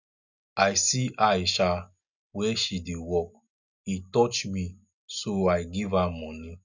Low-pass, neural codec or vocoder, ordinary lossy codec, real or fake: 7.2 kHz; none; none; real